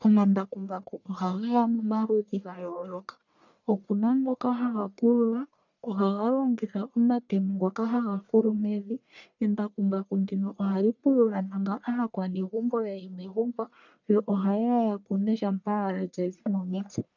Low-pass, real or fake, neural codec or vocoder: 7.2 kHz; fake; codec, 44.1 kHz, 1.7 kbps, Pupu-Codec